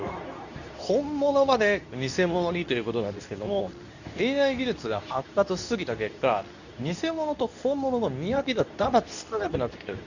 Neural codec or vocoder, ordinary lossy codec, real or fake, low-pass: codec, 24 kHz, 0.9 kbps, WavTokenizer, medium speech release version 2; none; fake; 7.2 kHz